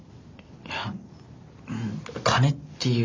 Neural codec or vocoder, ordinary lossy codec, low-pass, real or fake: none; MP3, 64 kbps; 7.2 kHz; real